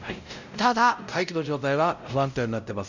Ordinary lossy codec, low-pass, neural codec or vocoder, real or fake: none; 7.2 kHz; codec, 16 kHz, 0.5 kbps, X-Codec, WavLM features, trained on Multilingual LibriSpeech; fake